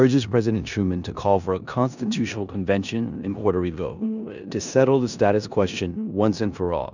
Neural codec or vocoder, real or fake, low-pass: codec, 16 kHz in and 24 kHz out, 0.9 kbps, LongCat-Audio-Codec, four codebook decoder; fake; 7.2 kHz